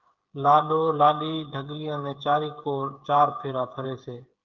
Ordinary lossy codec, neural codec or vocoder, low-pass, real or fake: Opus, 32 kbps; codec, 16 kHz, 8 kbps, FreqCodec, smaller model; 7.2 kHz; fake